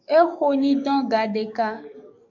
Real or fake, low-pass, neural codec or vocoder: fake; 7.2 kHz; codec, 44.1 kHz, 7.8 kbps, DAC